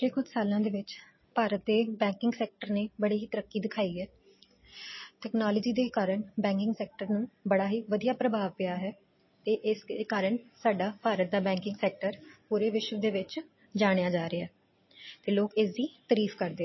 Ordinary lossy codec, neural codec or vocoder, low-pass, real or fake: MP3, 24 kbps; none; 7.2 kHz; real